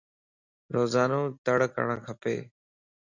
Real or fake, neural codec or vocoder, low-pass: real; none; 7.2 kHz